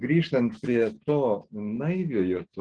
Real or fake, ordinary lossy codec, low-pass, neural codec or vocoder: real; Opus, 16 kbps; 9.9 kHz; none